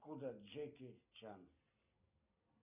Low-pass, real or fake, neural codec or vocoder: 3.6 kHz; real; none